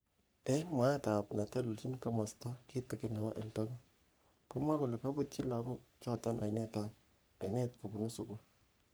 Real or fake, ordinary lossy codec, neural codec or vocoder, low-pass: fake; none; codec, 44.1 kHz, 3.4 kbps, Pupu-Codec; none